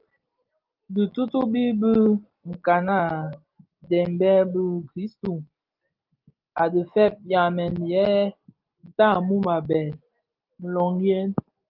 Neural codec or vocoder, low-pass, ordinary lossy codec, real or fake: none; 5.4 kHz; Opus, 32 kbps; real